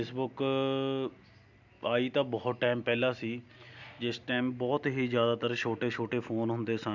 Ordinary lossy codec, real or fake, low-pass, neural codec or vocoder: none; real; 7.2 kHz; none